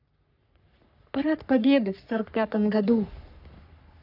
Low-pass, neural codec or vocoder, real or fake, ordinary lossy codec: 5.4 kHz; codec, 44.1 kHz, 3.4 kbps, Pupu-Codec; fake; none